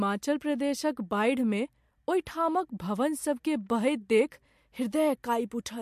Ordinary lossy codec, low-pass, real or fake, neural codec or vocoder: MP3, 64 kbps; 14.4 kHz; real; none